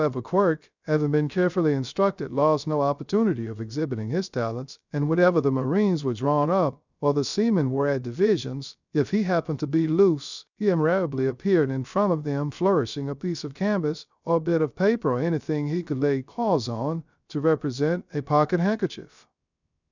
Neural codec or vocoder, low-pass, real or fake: codec, 16 kHz, 0.3 kbps, FocalCodec; 7.2 kHz; fake